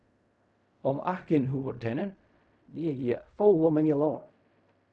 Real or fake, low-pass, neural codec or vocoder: fake; 10.8 kHz; codec, 16 kHz in and 24 kHz out, 0.4 kbps, LongCat-Audio-Codec, fine tuned four codebook decoder